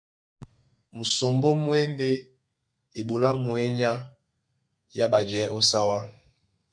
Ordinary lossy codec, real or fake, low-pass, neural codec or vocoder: MP3, 64 kbps; fake; 9.9 kHz; codec, 32 kHz, 1.9 kbps, SNAC